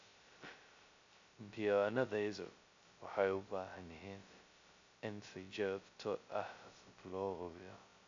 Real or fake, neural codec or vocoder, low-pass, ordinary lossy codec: fake; codec, 16 kHz, 0.2 kbps, FocalCodec; 7.2 kHz; AAC, 64 kbps